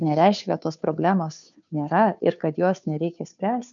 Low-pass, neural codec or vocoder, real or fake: 7.2 kHz; codec, 16 kHz, 2 kbps, FunCodec, trained on Chinese and English, 25 frames a second; fake